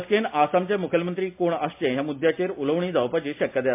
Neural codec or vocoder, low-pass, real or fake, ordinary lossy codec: none; 3.6 kHz; real; MP3, 32 kbps